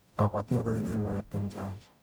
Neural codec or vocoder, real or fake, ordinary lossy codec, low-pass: codec, 44.1 kHz, 0.9 kbps, DAC; fake; none; none